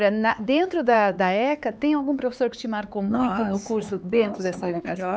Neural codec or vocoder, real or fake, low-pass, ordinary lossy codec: codec, 16 kHz, 4 kbps, X-Codec, HuBERT features, trained on LibriSpeech; fake; none; none